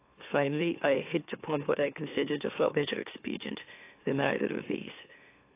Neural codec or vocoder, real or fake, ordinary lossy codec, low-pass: autoencoder, 44.1 kHz, a latent of 192 numbers a frame, MeloTTS; fake; AAC, 24 kbps; 3.6 kHz